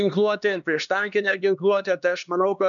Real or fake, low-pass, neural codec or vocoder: fake; 7.2 kHz; codec, 16 kHz, 2 kbps, X-Codec, HuBERT features, trained on LibriSpeech